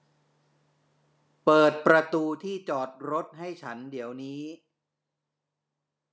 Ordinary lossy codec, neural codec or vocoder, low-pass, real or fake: none; none; none; real